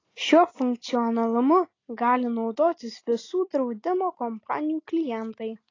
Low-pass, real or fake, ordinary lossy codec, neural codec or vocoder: 7.2 kHz; real; AAC, 32 kbps; none